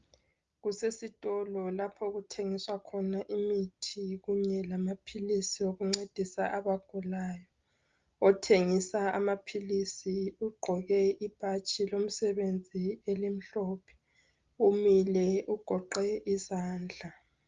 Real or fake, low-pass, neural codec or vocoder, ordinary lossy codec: real; 7.2 kHz; none; Opus, 32 kbps